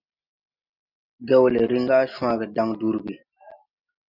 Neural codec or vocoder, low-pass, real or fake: none; 5.4 kHz; real